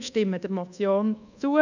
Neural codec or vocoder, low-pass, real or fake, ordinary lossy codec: codec, 24 kHz, 1.2 kbps, DualCodec; 7.2 kHz; fake; none